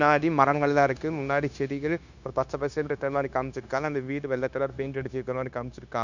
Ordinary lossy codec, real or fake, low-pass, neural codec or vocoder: none; fake; 7.2 kHz; codec, 16 kHz, 0.9 kbps, LongCat-Audio-Codec